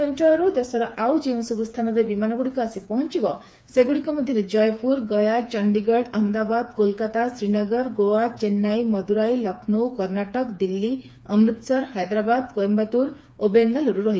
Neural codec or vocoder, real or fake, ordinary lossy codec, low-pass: codec, 16 kHz, 4 kbps, FreqCodec, smaller model; fake; none; none